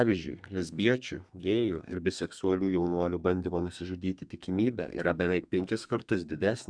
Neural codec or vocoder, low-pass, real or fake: codec, 32 kHz, 1.9 kbps, SNAC; 9.9 kHz; fake